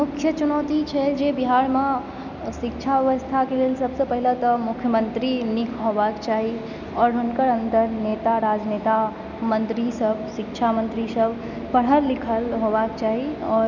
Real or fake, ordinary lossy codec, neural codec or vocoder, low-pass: real; none; none; 7.2 kHz